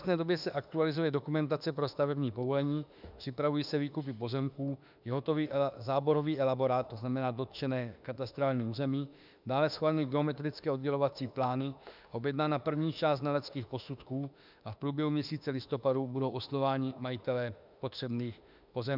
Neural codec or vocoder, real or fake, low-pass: autoencoder, 48 kHz, 32 numbers a frame, DAC-VAE, trained on Japanese speech; fake; 5.4 kHz